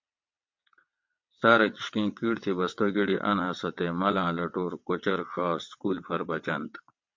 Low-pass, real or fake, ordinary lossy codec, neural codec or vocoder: 7.2 kHz; fake; MP3, 48 kbps; vocoder, 22.05 kHz, 80 mel bands, WaveNeXt